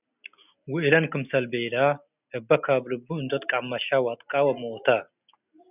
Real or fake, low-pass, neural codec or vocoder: real; 3.6 kHz; none